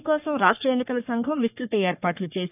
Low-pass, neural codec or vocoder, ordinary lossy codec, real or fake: 3.6 kHz; codec, 44.1 kHz, 3.4 kbps, Pupu-Codec; none; fake